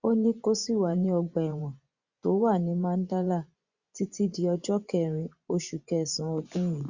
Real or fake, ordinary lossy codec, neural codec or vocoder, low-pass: fake; Opus, 64 kbps; vocoder, 24 kHz, 100 mel bands, Vocos; 7.2 kHz